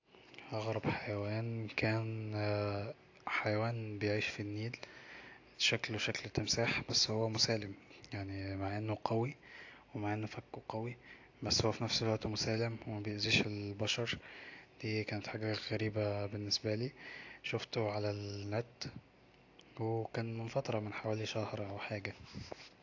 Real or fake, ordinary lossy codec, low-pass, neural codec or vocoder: real; AAC, 48 kbps; 7.2 kHz; none